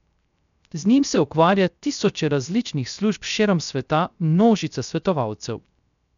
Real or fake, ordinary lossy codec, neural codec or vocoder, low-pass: fake; none; codec, 16 kHz, 0.3 kbps, FocalCodec; 7.2 kHz